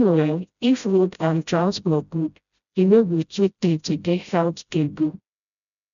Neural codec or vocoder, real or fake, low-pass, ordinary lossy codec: codec, 16 kHz, 0.5 kbps, FreqCodec, smaller model; fake; 7.2 kHz; none